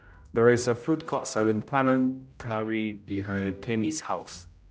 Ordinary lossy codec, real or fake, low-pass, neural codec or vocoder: none; fake; none; codec, 16 kHz, 0.5 kbps, X-Codec, HuBERT features, trained on general audio